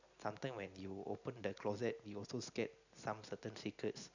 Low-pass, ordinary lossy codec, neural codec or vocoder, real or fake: 7.2 kHz; none; none; real